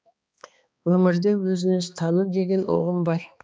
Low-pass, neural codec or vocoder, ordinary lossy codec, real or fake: none; codec, 16 kHz, 2 kbps, X-Codec, HuBERT features, trained on balanced general audio; none; fake